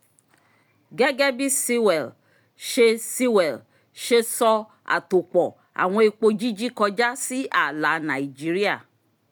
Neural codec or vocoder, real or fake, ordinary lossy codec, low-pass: none; real; none; none